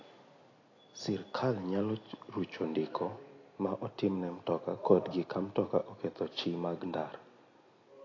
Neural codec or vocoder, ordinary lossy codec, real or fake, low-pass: none; AAC, 32 kbps; real; 7.2 kHz